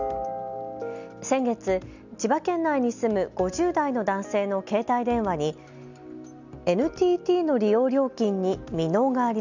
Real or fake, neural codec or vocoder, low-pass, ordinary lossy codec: real; none; 7.2 kHz; none